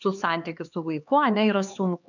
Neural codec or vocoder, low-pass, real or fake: codec, 16 kHz, 2 kbps, X-Codec, HuBERT features, trained on LibriSpeech; 7.2 kHz; fake